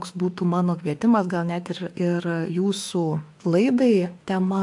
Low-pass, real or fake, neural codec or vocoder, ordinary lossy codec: 10.8 kHz; fake; autoencoder, 48 kHz, 32 numbers a frame, DAC-VAE, trained on Japanese speech; AAC, 64 kbps